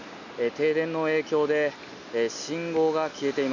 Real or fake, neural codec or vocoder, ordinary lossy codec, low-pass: real; none; none; 7.2 kHz